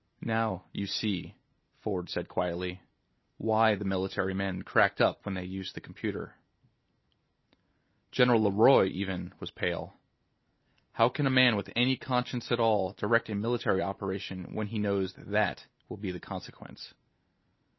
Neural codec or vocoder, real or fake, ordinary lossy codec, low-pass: none; real; MP3, 24 kbps; 7.2 kHz